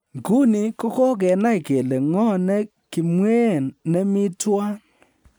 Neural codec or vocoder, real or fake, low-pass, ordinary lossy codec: none; real; none; none